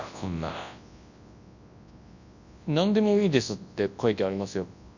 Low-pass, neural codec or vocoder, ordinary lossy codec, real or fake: 7.2 kHz; codec, 24 kHz, 0.9 kbps, WavTokenizer, large speech release; none; fake